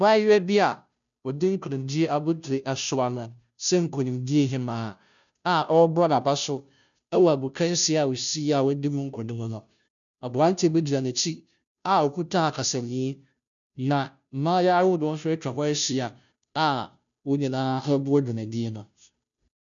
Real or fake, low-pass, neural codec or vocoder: fake; 7.2 kHz; codec, 16 kHz, 0.5 kbps, FunCodec, trained on Chinese and English, 25 frames a second